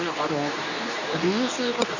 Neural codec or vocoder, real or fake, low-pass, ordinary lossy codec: codec, 24 kHz, 0.9 kbps, WavTokenizer, medium speech release version 2; fake; 7.2 kHz; none